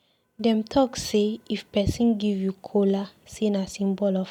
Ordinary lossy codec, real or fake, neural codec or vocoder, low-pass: none; real; none; 19.8 kHz